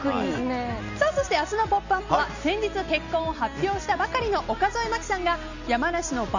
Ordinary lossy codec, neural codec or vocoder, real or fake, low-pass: none; none; real; 7.2 kHz